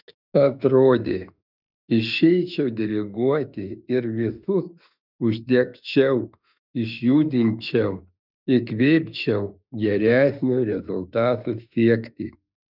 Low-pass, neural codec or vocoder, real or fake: 5.4 kHz; autoencoder, 48 kHz, 32 numbers a frame, DAC-VAE, trained on Japanese speech; fake